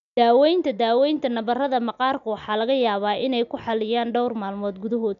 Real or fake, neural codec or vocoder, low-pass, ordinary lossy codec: real; none; 7.2 kHz; none